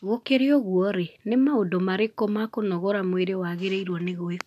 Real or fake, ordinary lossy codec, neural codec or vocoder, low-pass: real; none; none; 14.4 kHz